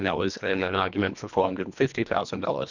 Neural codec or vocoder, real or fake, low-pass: codec, 24 kHz, 1.5 kbps, HILCodec; fake; 7.2 kHz